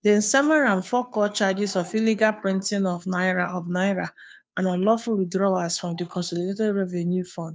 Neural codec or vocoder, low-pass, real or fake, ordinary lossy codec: codec, 16 kHz, 2 kbps, FunCodec, trained on Chinese and English, 25 frames a second; none; fake; none